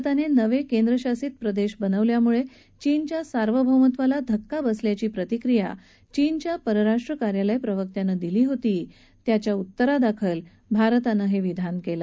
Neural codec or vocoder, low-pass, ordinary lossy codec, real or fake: none; none; none; real